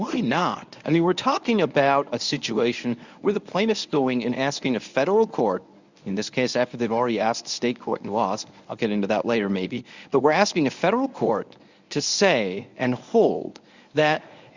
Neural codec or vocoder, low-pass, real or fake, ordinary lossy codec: codec, 24 kHz, 0.9 kbps, WavTokenizer, medium speech release version 1; 7.2 kHz; fake; Opus, 64 kbps